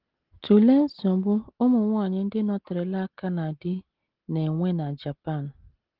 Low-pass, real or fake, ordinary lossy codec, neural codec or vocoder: 5.4 kHz; real; Opus, 16 kbps; none